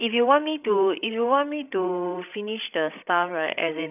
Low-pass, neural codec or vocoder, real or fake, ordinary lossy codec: 3.6 kHz; codec, 16 kHz, 16 kbps, FreqCodec, larger model; fake; none